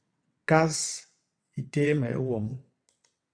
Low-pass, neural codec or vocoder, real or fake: 9.9 kHz; vocoder, 22.05 kHz, 80 mel bands, WaveNeXt; fake